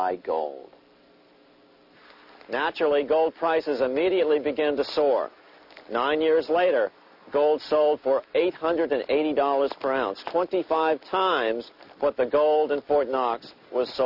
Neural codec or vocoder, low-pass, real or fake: none; 5.4 kHz; real